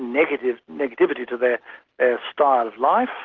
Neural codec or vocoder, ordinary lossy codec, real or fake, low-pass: none; Opus, 16 kbps; real; 7.2 kHz